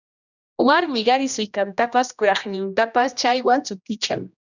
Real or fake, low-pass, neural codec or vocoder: fake; 7.2 kHz; codec, 16 kHz, 1 kbps, X-Codec, HuBERT features, trained on general audio